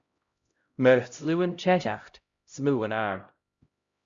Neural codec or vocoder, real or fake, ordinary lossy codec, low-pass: codec, 16 kHz, 0.5 kbps, X-Codec, HuBERT features, trained on LibriSpeech; fake; Opus, 64 kbps; 7.2 kHz